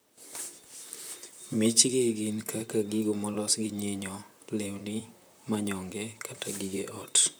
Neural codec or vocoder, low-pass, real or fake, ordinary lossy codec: vocoder, 44.1 kHz, 128 mel bands, Pupu-Vocoder; none; fake; none